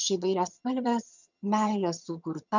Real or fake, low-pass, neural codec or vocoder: fake; 7.2 kHz; vocoder, 22.05 kHz, 80 mel bands, HiFi-GAN